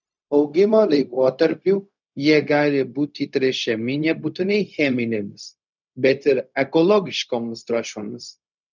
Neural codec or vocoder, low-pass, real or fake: codec, 16 kHz, 0.4 kbps, LongCat-Audio-Codec; 7.2 kHz; fake